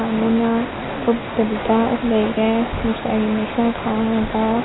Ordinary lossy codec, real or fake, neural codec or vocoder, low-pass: AAC, 16 kbps; real; none; 7.2 kHz